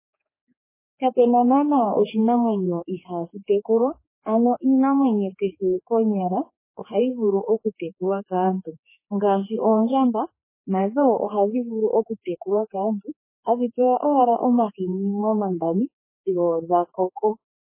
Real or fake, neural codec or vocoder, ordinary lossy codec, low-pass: fake; codec, 16 kHz, 4 kbps, X-Codec, HuBERT features, trained on general audio; MP3, 16 kbps; 3.6 kHz